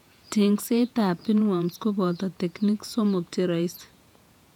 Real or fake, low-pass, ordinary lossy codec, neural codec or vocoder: fake; 19.8 kHz; none; vocoder, 48 kHz, 128 mel bands, Vocos